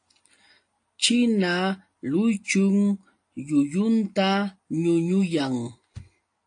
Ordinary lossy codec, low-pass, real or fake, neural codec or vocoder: AAC, 48 kbps; 9.9 kHz; real; none